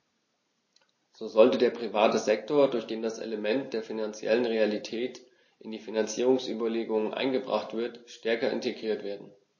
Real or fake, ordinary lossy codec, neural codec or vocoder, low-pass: real; MP3, 32 kbps; none; 7.2 kHz